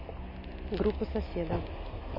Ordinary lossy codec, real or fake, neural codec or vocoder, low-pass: MP3, 24 kbps; real; none; 5.4 kHz